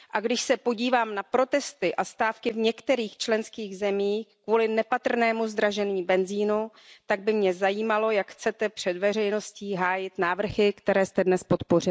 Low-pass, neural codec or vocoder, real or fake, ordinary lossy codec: none; none; real; none